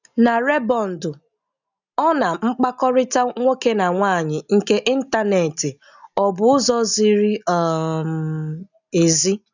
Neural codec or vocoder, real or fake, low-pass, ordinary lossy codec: none; real; 7.2 kHz; none